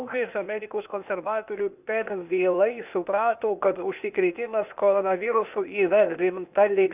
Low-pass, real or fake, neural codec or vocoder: 3.6 kHz; fake; codec, 16 kHz, 0.8 kbps, ZipCodec